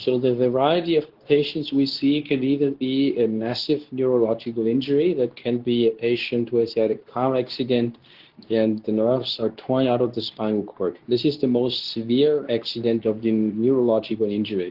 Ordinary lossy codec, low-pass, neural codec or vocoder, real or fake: Opus, 16 kbps; 5.4 kHz; codec, 24 kHz, 0.9 kbps, WavTokenizer, medium speech release version 2; fake